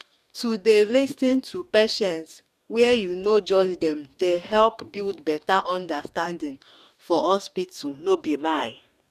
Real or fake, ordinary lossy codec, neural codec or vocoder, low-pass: fake; none; codec, 44.1 kHz, 2.6 kbps, DAC; 14.4 kHz